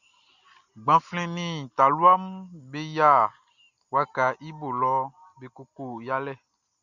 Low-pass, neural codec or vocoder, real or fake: 7.2 kHz; none; real